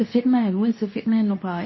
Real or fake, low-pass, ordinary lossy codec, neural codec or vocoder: fake; 7.2 kHz; MP3, 24 kbps; codec, 24 kHz, 0.9 kbps, WavTokenizer, medium speech release version 2